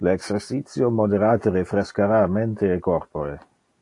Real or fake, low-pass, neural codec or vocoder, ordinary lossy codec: real; 10.8 kHz; none; AAC, 48 kbps